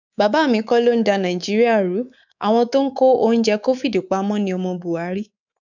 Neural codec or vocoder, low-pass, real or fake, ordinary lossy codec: codec, 24 kHz, 3.1 kbps, DualCodec; 7.2 kHz; fake; none